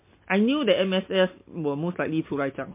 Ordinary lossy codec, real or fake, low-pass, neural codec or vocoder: MP3, 24 kbps; real; 3.6 kHz; none